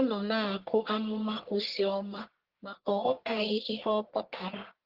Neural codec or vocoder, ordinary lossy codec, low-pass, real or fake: codec, 44.1 kHz, 1.7 kbps, Pupu-Codec; Opus, 16 kbps; 5.4 kHz; fake